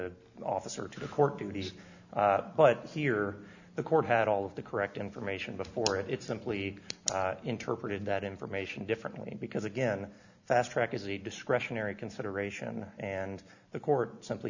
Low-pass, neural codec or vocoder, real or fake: 7.2 kHz; none; real